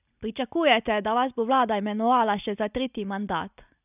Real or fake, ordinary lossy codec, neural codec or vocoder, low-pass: real; none; none; 3.6 kHz